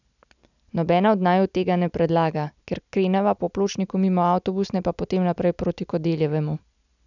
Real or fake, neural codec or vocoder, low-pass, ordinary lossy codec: real; none; 7.2 kHz; none